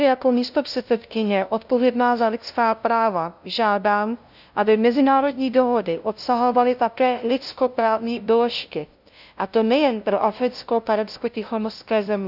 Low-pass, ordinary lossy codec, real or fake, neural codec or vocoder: 5.4 kHz; none; fake; codec, 16 kHz, 0.5 kbps, FunCodec, trained on LibriTTS, 25 frames a second